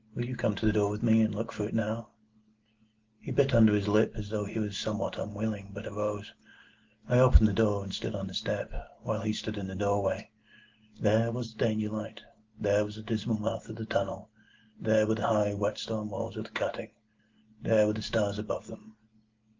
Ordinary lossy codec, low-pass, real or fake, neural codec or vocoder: Opus, 16 kbps; 7.2 kHz; real; none